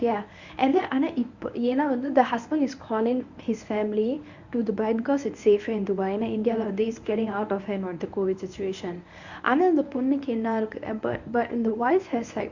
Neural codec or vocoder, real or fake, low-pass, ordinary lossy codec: codec, 24 kHz, 0.9 kbps, WavTokenizer, medium speech release version 1; fake; 7.2 kHz; Opus, 64 kbps